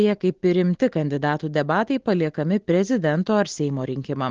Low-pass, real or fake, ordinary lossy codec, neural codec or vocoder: 7.2 kHz; real; Opus, 16 kbps; none